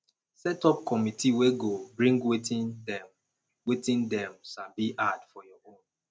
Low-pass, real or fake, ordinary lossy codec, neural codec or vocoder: none; real; none; none